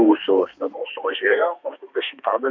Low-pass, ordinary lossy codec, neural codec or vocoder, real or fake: 7.2 kHz; AAC, 48 kbps; codec, 32 kHz, 1.9 kbps, SNAC; fake